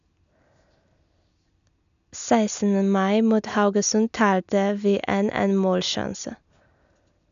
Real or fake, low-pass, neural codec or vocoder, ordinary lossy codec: real; 7.2 kHz; none; none